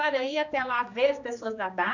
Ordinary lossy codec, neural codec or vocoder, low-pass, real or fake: none; codec, 16 kHz, 2 kbps, X-Codec, HuBERT features, trained on general audio; 7.2 kHz; fake